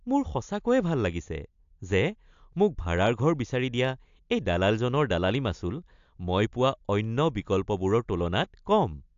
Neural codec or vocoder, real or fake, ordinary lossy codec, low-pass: none; real; AAC, 64 kbps; 7.2 kHz